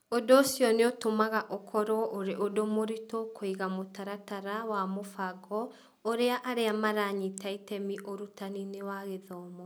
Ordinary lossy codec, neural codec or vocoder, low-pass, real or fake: none; none; none; real